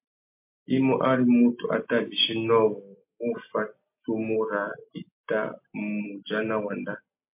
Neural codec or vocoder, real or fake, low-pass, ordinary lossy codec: none; real; 3.6 kHz; MP3, 32 kbps